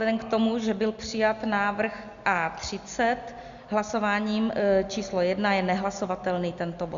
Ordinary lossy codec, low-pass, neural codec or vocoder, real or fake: Opus, 64 kbps; 7.2 kHz; none; real